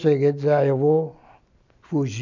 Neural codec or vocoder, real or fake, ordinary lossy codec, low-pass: none; real; none; 7.2 kHz